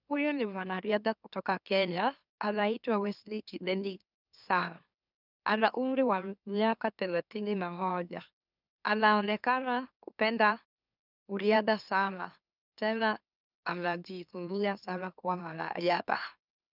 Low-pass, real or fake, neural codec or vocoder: 5.4 kHz; fake; autoencoder, 44.1 kHz, a latent of 192 numbers a frame, MeloTTS